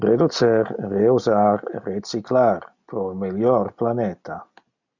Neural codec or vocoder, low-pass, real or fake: none; 7.2 kHz; real